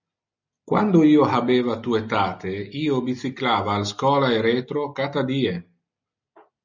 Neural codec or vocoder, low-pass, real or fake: none; 7.2 kHz; real